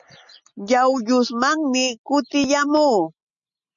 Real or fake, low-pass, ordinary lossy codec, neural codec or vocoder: real; 7.2 kHz; MP3, 64 kbps; none